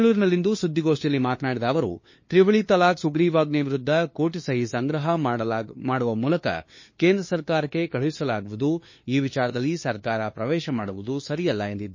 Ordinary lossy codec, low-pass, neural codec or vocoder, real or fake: MP3, 32 kbps; 7.2 kHz; codec, 16 kHz, 2 kbps, FunCodec, trained on LibriTTS, 25 frames a second; fake